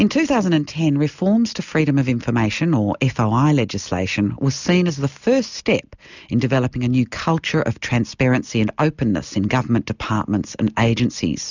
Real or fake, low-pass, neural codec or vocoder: real; 7.2 kHz; none